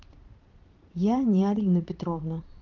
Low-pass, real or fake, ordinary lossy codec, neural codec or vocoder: 7.2 kHz; fake; Opus, 24 kbps; vocoder, 22.05 kHz, 80 mel bands, Vocos